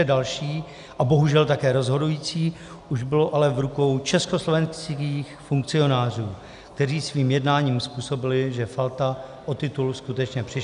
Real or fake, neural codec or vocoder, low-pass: real; none; 10.8 kHz